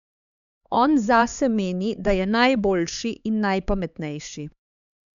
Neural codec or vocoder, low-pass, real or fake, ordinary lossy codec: codec, 16 kHz, 4 kbps, X-Codec, HuBERT features, trained on LibriSpeech; 7.2 kHz; fake; none